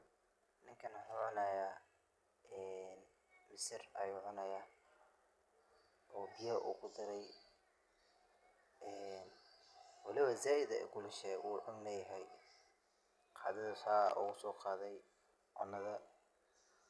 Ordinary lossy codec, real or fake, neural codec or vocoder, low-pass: none; real; none; none